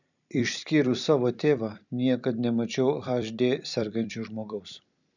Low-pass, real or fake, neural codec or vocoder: 7.2 kHz; fake; vocoder, 44.1 kHz, 128 mel bands every 256 samples, BigVGAN v2